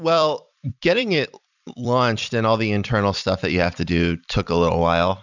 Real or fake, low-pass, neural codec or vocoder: fake; 7.2 kHz; vocoder, 44.1 kHz, 128 mel bands every 256 samples, BigVGAN v2